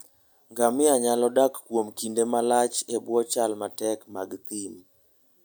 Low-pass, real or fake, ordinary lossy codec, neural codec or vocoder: none; real; none; none